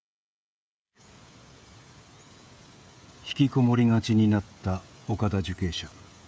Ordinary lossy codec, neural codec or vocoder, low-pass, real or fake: none; codec, 16 kHz, 16 kbps, FreqCodec, smaller model; none; fake